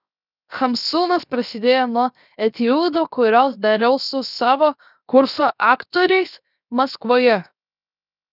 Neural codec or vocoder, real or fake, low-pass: codec, 16 kHz, 0.7 kbps, FocalCodec; fake; 5.4 kHz